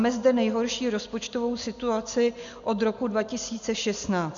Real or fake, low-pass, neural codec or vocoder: real; 7.2 kHz; none